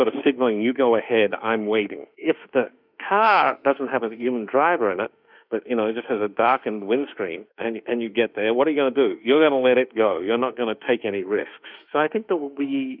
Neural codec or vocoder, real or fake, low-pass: autoencoder, 48 kHz, 32 numbers a frame, DAC-VAE, trained on Japanese speech; fake; 5.4 kHz